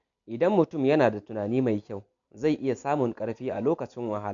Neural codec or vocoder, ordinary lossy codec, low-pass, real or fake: none; none; 7.2 kHz; real